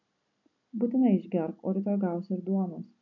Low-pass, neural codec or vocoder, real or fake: 7.2 kHz; none; real